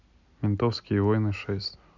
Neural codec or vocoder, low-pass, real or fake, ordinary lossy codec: none; 7.2 kHz; real; none